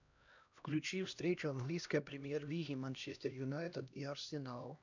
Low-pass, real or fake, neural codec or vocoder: 7.2 kHz; fake; codec, 16 kHz, 1 kbps, X-Codec, HuBERT features, trained on LibriSpeech